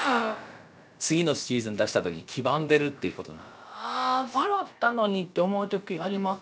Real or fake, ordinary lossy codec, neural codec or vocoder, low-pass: fake; none; codec, 16 kHz, about 1 kbps, DyCAST, with the encoder's durations; none